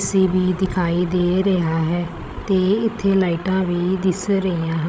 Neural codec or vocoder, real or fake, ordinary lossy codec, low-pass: codec, 16 kHz, 16 kbps, FreqCodec, larger model; fake; none; none